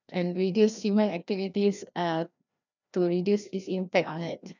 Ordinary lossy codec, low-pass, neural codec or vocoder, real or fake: none; 7.2 kHz; codec, 16 kHz, 1 kbps, FreqCodec, larger model; fake